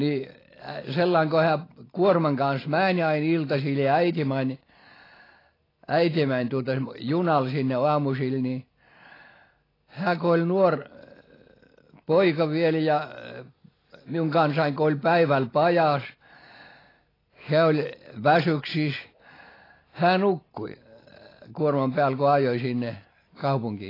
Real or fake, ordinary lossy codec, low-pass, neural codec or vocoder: real; AAC, 24 kbps; 5.4 kHz; none